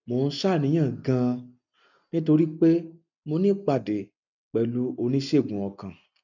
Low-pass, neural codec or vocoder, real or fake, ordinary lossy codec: 7.2 kHz; none; real; none